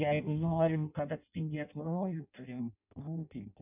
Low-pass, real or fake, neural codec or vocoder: 3.6 kHz; fake; codec, 16 kHz in and 24 kHz out, 0.6 kbps, FireRedTTS-2 codec